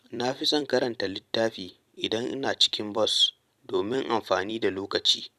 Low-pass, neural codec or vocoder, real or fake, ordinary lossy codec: 14.4 kHz; vocoder, 44.1 kHz, 128 mel bands every 256 samples, BigVGAN v2; fake; none